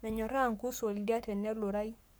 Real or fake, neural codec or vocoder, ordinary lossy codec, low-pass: fake; codec, 44.1 kHz, 7.8 kbps, Pupu-Codec; none; none